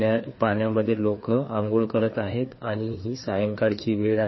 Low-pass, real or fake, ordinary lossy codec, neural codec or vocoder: 7.2 kHz; fake; MP3, 24 kbps; codec, 16 kHz, 2 kbps, FreqCodec, larger model